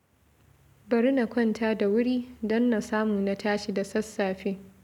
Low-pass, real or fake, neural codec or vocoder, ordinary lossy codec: 19.8 kHz; real; none; none